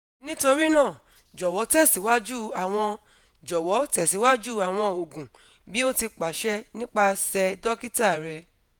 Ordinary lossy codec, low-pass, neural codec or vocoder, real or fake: none; none; vocoder, 48 kHz, 128 mel bands, Vocos; fake